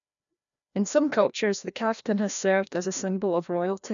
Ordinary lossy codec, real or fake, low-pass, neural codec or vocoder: none; fake; 7.2 kHz; codec, 16 kHz, 1 kbps, FreqCodec, larger model